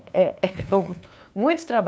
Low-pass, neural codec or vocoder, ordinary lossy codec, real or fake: none; codec, 16 kHz, 2 kbps, FunCodec, trained on LibriTTS, 25 frames a second; none; fake